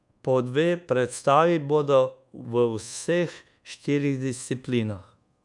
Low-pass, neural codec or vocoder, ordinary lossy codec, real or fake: 10.8 kHz; codec, 24 kHz, 1.2 kbps, DualCodec; none; fake